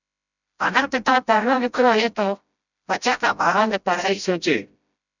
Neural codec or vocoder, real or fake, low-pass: codec, 16 kHz, 0.5 kbps, FreqCodec, smaller model; fake; 7.2 kHz